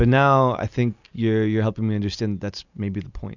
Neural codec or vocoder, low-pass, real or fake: none; 7.2 kHz; real